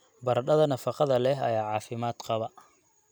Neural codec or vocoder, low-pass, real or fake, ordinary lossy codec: none; none; real; none